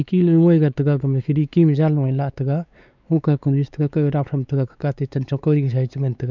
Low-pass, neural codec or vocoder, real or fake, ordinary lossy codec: 7.2 kHz; codec, 16 kHz, 4 kbps, X-Codec, WavLM features, trained on Multilingual LibriSpeech; fake; none